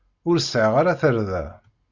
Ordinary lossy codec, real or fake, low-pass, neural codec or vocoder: Opus, 64 kbps; real; 7.2 kHz; none